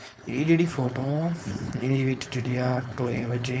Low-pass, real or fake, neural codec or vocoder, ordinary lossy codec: none; fake; codec, 16 kHz, 4.8 kbps, FACodec; none